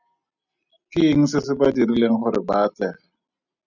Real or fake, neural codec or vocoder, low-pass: real; none; 7.2 kHz